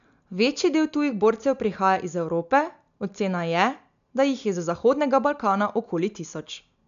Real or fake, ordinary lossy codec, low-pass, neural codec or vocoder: real; none; 7.2 kHz; none